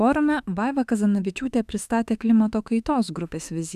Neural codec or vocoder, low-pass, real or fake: autoencoder, 48 kHz, 32 numbers a frame, DAC-VAE, trained on Japanese speech; 14.4 kHz; fake